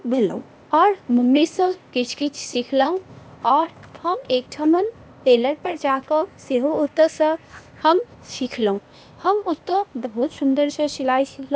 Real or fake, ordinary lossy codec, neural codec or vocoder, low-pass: fake; none; codec, 16 kHz, 0.8 kbps, ZipCodec; none